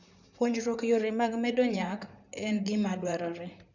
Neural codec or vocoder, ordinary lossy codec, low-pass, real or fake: vocoder, 44.1 kHz, 128 mel bands, Pupu-Vocoder; none; 7.2 kHz; fake